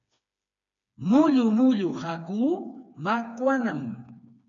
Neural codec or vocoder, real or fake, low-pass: codec, 16 kHz, 4 kbps, FreqCodec, smaller model; fake; 7.2 kHz